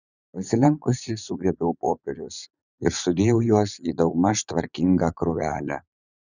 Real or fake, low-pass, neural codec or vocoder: fake; 7.2 kHz; vocoder, 44.1 kHz, 80 mel bands, Vocos